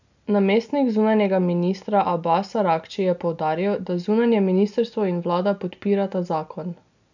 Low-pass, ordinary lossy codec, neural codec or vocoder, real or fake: 7.2 kHz; none; none; real